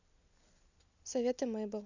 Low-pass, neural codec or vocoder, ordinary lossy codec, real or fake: 7.2 kHz; none; none; real